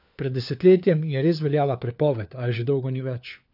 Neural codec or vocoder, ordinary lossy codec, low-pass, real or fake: codec, 16 kHz, 4 kbps, FunCodec, trained on LibriTTS, 50 frames a second; none; 5.4 kHz; fake